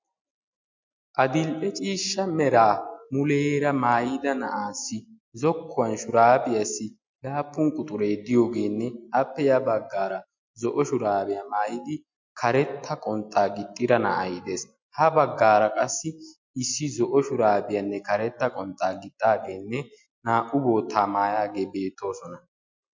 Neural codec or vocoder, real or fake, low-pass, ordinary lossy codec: none; real; 7.2 kHz; MP3, 48 kbps